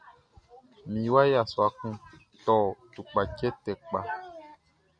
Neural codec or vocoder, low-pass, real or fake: none; 9.9 kHz; real